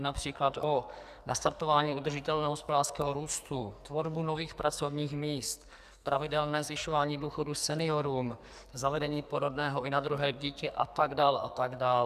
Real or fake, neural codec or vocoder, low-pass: fake; codec, 44.1 kHz, 2.6 kbps, SNAC; 14.4 kHz